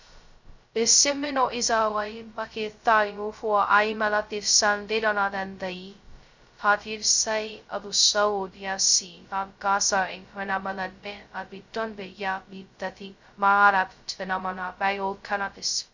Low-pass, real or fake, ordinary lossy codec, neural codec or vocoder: 7.2 kHz; fake; Opus, 64 kbps; codec, 16 kHz, 0.2 kbps, FocalCodec